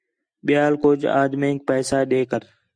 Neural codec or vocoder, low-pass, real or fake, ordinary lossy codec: none; 9.9 kHz; real; Opus, 64 kbps